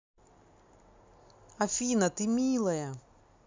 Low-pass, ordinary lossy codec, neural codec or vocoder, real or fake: 7.2 kHz; MP3, 64 kbps; none; real